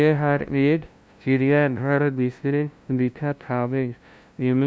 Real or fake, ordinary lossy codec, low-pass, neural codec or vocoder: fake; none; none; codec, 16 kHz, 0.5 kbps, FunCodec, trained on LibriTTS, 25 frames a second